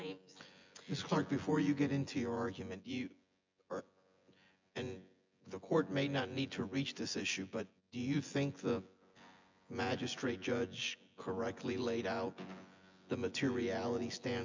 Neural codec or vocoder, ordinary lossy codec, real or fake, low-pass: vocoder, 24 kHz, 100 mel bands, Vocos; MP3, 64 kbps; fake; 7.2 kHz